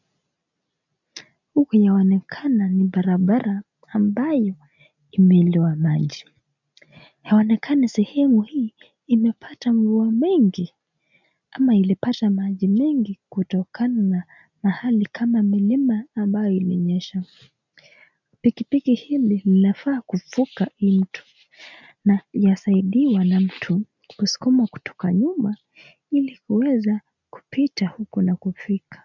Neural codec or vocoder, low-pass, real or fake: none; 7.2 kHz; real